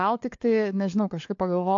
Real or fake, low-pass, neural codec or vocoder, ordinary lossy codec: fake; 7.2 kHz; codec, 16 kHz, 4 kbps, FunCodec, trained on LibriTTS, 50 frames a second; AAC, 48 kbps